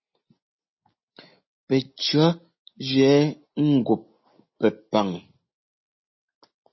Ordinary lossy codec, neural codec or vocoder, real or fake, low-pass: MP3, 24 kbps; none; real; 7.2 kHz